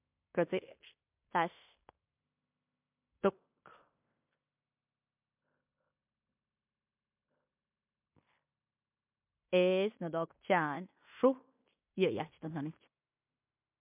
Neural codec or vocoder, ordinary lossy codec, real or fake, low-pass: codec, 16 kHz in and 24 kHz out, 0.9 kbps, LongCat-Audio-Codec, fine tuned four codebook decoder; MP3, 32 kbps; fake; 3.6 kHz